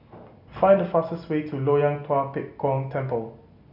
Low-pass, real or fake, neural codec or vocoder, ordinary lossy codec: 5.4 kHz; real; none; none